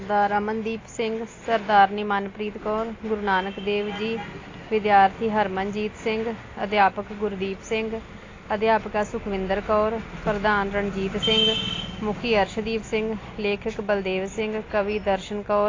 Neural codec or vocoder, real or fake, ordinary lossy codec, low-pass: none; real; AAC, 32 kbps; 7.2 kHz